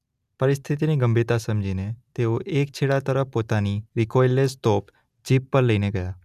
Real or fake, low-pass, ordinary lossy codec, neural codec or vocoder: real; 14.4 kHz; none; none